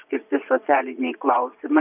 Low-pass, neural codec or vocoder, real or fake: 3.6 kHz; vocoder, 22.05 kHz, 80 mel bands, Vocos; fake